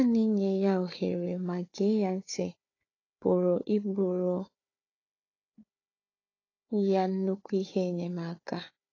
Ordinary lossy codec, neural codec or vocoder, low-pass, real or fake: AAC, 32 kbps; codec, 16 kHz, 4 kbps, FunCodec, trained on Chinese and English, 50 frames a second; 7.2 kHz; fake